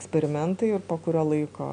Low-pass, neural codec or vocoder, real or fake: 9.9 kHz; none; real